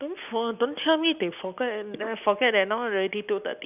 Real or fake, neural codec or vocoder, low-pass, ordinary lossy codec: real; none; 3.6 kHz; none